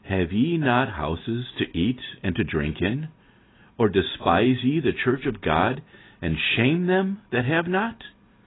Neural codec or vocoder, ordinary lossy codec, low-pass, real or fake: none; AAC, 16 kbps; 7.2 kHz; real